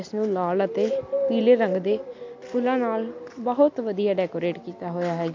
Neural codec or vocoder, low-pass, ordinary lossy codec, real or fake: none; 7.2 kHz; MP3, 48 kbps; real